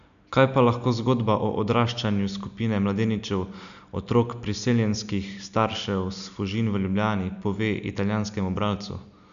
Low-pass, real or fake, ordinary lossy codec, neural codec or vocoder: 7.2 kHz; real; none; none